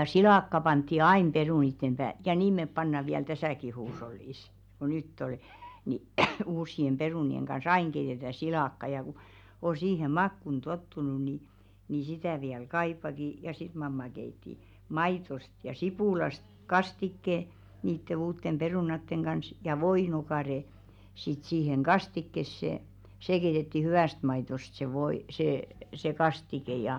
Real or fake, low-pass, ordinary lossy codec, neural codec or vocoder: real; 19.8 kHz; none; none